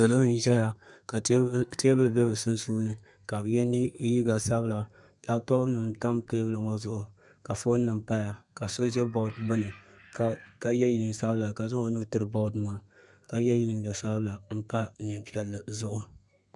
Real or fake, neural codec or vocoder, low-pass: fake; codec, 32 kHz, 1.9 kbps, SNAC; 10.8 kHz